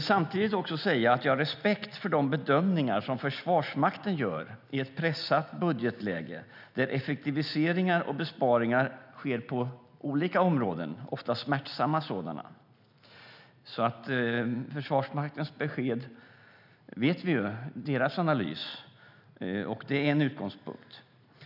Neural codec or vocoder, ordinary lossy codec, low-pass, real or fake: none; none; 5.4 kHz; real